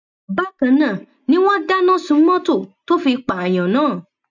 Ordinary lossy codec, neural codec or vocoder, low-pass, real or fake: none; none; 7.2 kHz; real